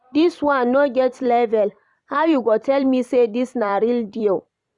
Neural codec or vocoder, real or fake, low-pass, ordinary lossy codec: vocoder, 44.1 kHz, 128 mel bands every 256 samples, BigVGAN v2; fake; 10.8 kHz; none